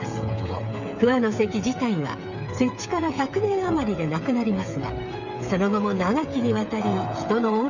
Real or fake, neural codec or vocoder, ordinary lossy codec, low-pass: fake; codec, 16 kHz, 8 kbps, FreqCodec, smaller model; none; 7.2 kHz